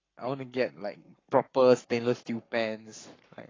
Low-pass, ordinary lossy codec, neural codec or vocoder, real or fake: 7.2 kHz; AAC, 32 kbps; codec, 44.1 kHz, 7.8 kbps, Pupu-Codec; fake